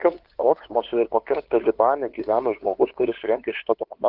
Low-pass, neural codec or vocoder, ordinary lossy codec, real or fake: 5.4 kHz; codec, 16 kHz, 2 kbps, FunCodec, trained on LibriTTS, 25 frames a second; Opus, 16 kbps; fake